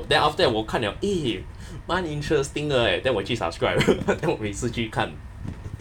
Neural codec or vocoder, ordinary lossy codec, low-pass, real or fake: none; none; 19.8 kHz; real